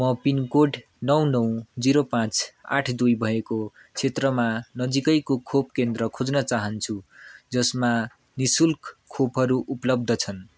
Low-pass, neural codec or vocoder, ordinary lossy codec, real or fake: none; none; none; real